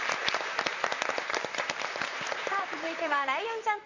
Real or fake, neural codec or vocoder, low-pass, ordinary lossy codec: fake; vocoder, 44.1 kHz, 128 mel bands, Pupu-Vocoder; 7.2 kHz; none